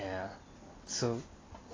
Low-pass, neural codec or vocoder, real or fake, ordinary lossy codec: 7.2 kHz; none; real; AAC, 32 kbps